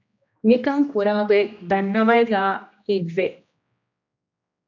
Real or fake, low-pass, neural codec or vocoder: fake; 7.2 kHz; codec, 16 kHz, 1 kbps, X-Codec, HuBERT features, trained on general audio